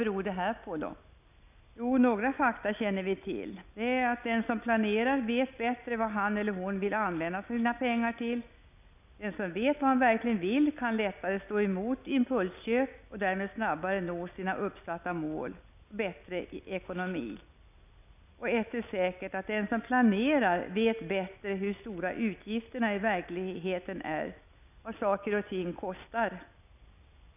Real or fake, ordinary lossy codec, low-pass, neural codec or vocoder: real; none; 3.6 kHz; none